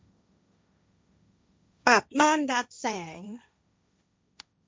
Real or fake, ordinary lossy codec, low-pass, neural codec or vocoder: fake; none; none; codec, 16 kHz, 1.1 kbps, Voila-Tokenizer